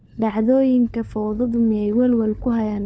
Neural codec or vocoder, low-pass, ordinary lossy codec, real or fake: codec, 16 kHz, 4 kbps, FunCodec, trained on LibriTTS, 50 frames a second; none; none; fake